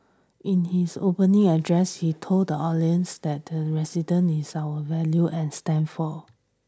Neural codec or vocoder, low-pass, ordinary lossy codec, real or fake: none; none; none; real